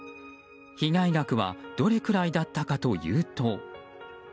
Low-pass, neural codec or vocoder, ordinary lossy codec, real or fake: none; none; none; real